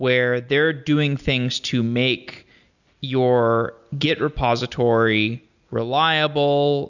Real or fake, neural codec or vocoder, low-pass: real; none; 7.2 kHz